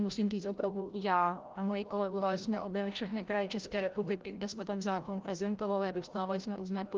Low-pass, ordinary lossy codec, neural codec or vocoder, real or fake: 7.2 kHz; Opus, 32 kbps; codec, 16 kHz, 0.5 kbps, FreqCodec, larger model; fake